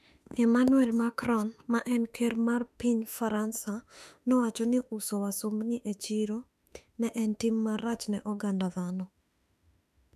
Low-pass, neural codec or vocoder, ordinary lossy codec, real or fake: 14.4 kHz; autoencoder, 48 kHz, 32 numbers a frame, DAC-VAE, trained on Japanese speech; none; fake